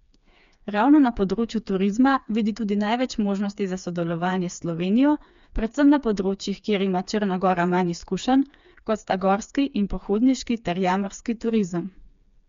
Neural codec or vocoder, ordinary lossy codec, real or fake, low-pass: codec, 16 kHz, 4 kbps, FreqCodec, smaller model; MP3, 64 kbps; fake; 7.2 kHz